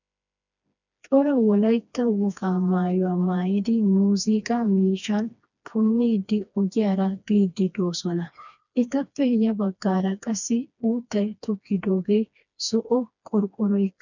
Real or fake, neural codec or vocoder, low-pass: fake; codec, 16 kHz, 2 kbps, FreqCodec, smaller model; 7.2 kHz